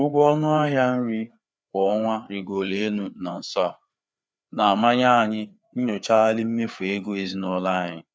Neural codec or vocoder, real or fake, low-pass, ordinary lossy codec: codec, 16 kHz, 4 kbps, FreqCodec, larger model; fake; none; none